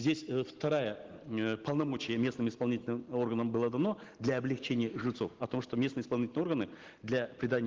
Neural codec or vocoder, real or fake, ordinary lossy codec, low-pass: none; real; Opus, 16 kbps; 7.2 kHz